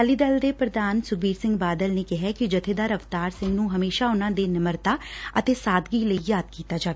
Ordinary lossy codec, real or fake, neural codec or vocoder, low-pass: none; real; none; none